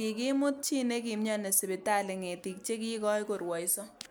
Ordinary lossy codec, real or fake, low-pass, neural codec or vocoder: none; real; none; none